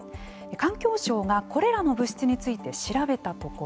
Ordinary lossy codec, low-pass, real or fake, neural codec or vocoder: none; none; real; none